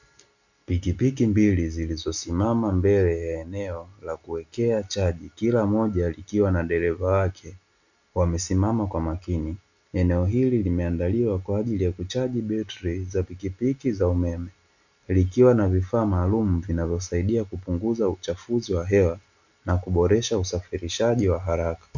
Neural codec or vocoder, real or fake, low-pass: none; real; 7.2 kHz